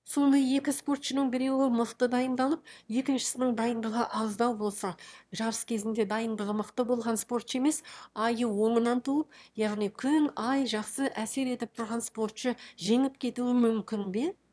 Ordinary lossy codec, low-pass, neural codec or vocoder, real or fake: none; none; autoencoder, 22.05 kHz, a latent of 192 numbers a frame, VITS, trained on one speaker; fake